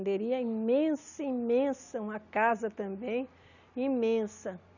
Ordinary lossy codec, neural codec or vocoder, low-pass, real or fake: none; none; 7.2 kHz; real